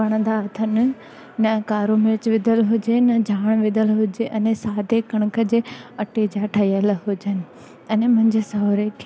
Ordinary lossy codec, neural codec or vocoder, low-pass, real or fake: none; none; none; real